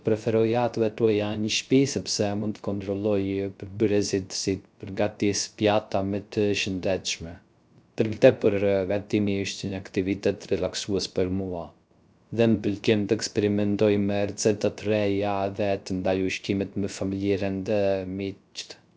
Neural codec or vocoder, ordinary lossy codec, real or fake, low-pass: codec, 16 kHz, 0.3 kbps, FocalCodec; none; fake; none